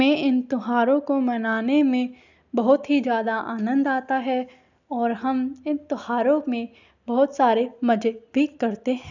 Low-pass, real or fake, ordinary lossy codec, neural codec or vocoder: 7.2 kHz; real; none; none